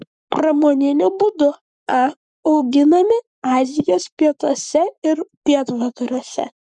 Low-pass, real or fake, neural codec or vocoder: 10.8 kHz; fake; codec, 44.1 kHz, 7.8 kbps, Pupu-Codec